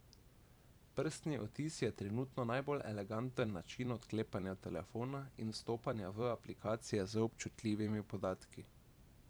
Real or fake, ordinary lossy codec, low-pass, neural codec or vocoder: fake; none; none; vocoder, 44.1 kHz, 128 mel bands every 512 samples, BigVGAN v2